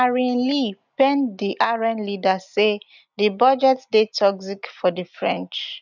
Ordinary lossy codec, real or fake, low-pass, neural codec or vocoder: none; real; 7.2 kHz; none